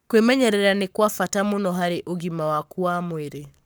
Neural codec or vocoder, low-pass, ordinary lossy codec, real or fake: codec, 44.1 kHz, 7.8 kbps, Pupu-Codec; none; none; fake